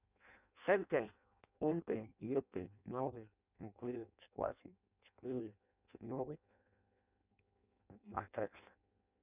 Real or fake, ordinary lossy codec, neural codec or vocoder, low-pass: fake; none; codec, 16 kHz in and 24 kHz out, 0.6 kbps, FireRedTTS-2 codec; 3.6 kHz